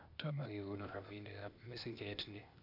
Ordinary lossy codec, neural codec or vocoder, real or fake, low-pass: none; codec, 16 kHz, 0.8 kbps, ZipCodec; fake; 5.4 kHz